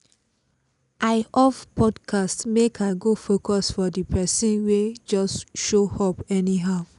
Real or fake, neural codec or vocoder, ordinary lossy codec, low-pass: real; none; none; 10.8 kHz